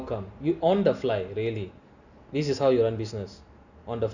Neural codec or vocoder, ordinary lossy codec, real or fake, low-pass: none; none; real; 7.2 kHz